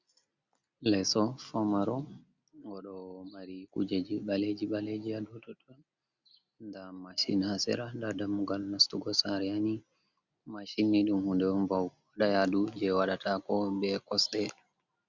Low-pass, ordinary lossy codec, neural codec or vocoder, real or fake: 7.2 kHz; Opus, 64 kbps; none; real